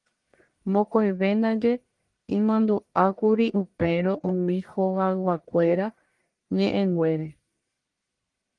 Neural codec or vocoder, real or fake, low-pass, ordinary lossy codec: codec, 44.1 kHz, 1.7 kbps, Pupu-Codec; fake; 10.8 kHz; Opus, 24 kbps